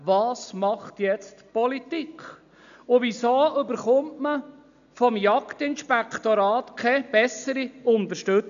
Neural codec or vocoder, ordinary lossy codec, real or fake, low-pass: none; none; real; 7.2 kHz